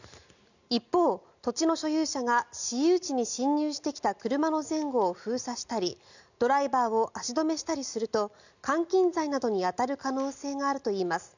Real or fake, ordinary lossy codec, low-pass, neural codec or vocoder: real; none; 7.2 kHz; none